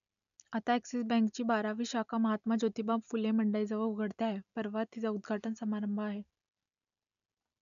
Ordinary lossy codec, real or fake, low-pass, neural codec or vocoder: none; real; 7.2 kHz; none